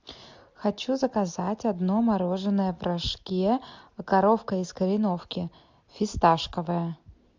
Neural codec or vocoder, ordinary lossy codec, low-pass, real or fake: none; MP3, 64 kbps; 7.2 kHz; real